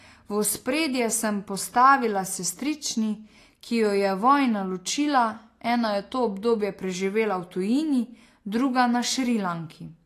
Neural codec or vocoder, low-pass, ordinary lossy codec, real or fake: none; 14.4 kHz; AAC, 48 kbps; real